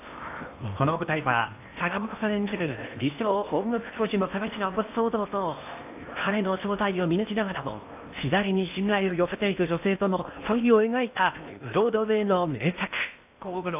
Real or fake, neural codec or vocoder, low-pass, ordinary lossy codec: fake; codec, 16 kHz in and 24 kHz out, 0.8 kbps, FocalCodec, streaming, 65536 codes; 3.6 kHz; AAC, 32 kbps